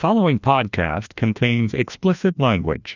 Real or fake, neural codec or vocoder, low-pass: fake; codec, 16 kHz, 1 kbps, FreqCodec, larger model; 7.2 kHz